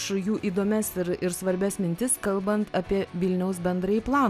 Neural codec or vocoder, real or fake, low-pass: none; real; 14.4 kHz